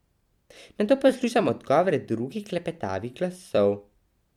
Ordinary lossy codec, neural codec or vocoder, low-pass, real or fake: MP3, 96 kbps; vocoder, 44.1 kHz, 128 mel bands every 256 samples, BigVGAN v2; 19.8 kHz; fake